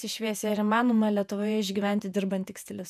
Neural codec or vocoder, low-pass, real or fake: vocoder, 48 kHz, 128 mel bands, Vocos; 14.4 kHz; fake